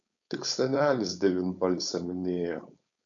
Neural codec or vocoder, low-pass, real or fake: codec, 16 kHz, 4.8 kbps, FACodec; 7.2 kHz; fake